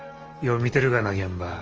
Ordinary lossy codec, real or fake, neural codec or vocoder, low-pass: Opus, 24 kbps; real; none; 7.2 kHz